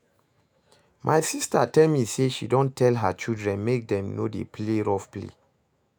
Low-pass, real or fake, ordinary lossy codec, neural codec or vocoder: none; fake; none; autoencoder, 48 kHz, 128 numbers a frame, DAC-VAE, trained on Japanese speech